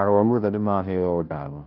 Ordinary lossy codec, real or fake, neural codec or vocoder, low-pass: Opus, 24 kbps; fake; codec, 16 kHz, 0.5 kbps, FunCodec, trained on Chinese and English, 25 frames a second; 5.4 kHz